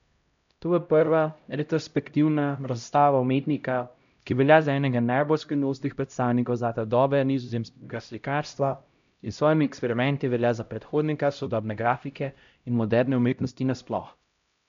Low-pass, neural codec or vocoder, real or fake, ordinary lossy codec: 7.2 kHz; codec, 16 kHz, 0.5 kbps, X-Codec, HuBERT features, trained on LibriSpeech; fake; MP3, 64 kbps